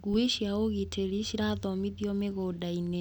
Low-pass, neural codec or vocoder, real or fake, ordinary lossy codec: 19.8 kHz; none; real; none